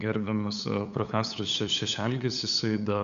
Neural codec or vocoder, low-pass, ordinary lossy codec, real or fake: codec, 16 kHz, 8 kbps, FunCodec, trained on LibriTTS, 25 frames a second; 7.2 kHz; MP3, 96 kbps; fake